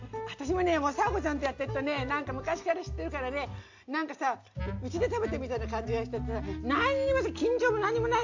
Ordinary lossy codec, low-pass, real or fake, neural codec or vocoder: AAC, 48 kbps; 7.2 kHz; real; none